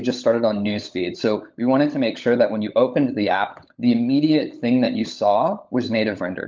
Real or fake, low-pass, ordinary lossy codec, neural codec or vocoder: fake; 7.2 kHz; Opus, 24 kbps; codec, 16 kHz, 16 kbps, FunCodec, trained on LibriTTS, 50 frames a second